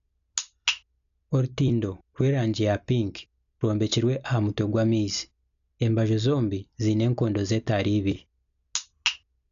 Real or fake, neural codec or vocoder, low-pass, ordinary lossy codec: real; none; 7.2 kHz; none